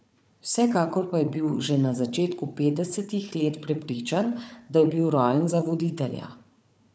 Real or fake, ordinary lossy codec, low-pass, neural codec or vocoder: fake; none; none; codec, 16 kHz, 4 kbps, FunCodec, trained on Chinese and English, 50 frames a second